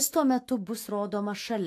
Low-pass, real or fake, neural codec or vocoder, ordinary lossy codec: 14.4 kHz; fake; autoencoder, 48 kHz, 128 numbers a frame, DAC-VAE, trained on Japanese speech; AAC, 48 kbps